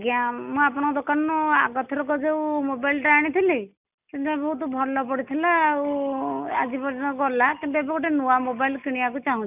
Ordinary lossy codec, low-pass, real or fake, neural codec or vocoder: none; 3.6 kHz; real; none